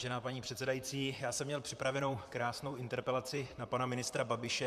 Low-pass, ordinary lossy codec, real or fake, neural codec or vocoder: 14.4 kHz; MP3, 96 kbps; fake; vocoder, 44.1 kHz, 128 mel bands, Pupu-Vocoder